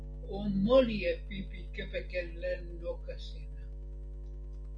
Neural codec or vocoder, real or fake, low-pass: none; real; 9.9 kHz